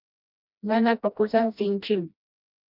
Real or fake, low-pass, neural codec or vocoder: fake; 5.4 kHz; codec, 16 kHz, 1 kbps, FreqCodec, smaller model